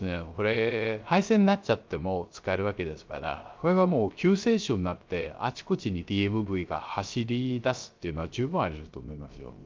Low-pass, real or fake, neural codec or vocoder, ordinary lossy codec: 7.2 kHz; fake; codec, 16 kHz, 0.3 kbps, FocalCodec; Opus, 24 kbps